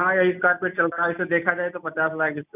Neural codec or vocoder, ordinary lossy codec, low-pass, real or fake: none; none; 3.6 kHz; real